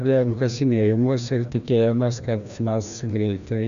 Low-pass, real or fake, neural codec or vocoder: 7.2 kHz; fake; codec, 16 kHz, 1 kbps, FreqCodec, larger model